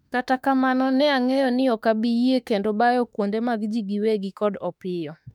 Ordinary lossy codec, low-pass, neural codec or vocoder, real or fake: none; 19.8 kHz; autoencoder, 48 kHz, 32 numbers a frame, DAC-VAE, trained on Japanese speech; fake